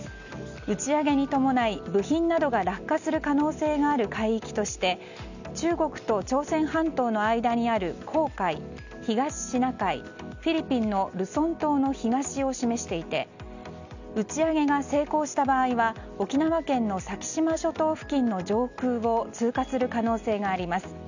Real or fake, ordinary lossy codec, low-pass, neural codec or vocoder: real; none; 7.2 kHz; none